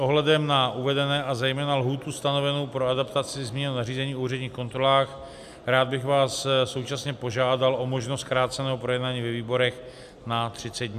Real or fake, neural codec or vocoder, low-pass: real; none; 14.4 kHz